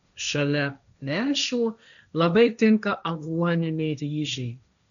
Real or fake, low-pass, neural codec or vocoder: fake; 7.2 kHz; codec, 16 kHz, 1.1 kbps, Voila-Tokenizer